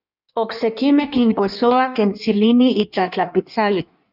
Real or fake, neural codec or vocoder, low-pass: fake; codec, 16 kHz in and 24 kHz out, 1.1 kbps, FireRedTTS-2 codec; 5.4 kHz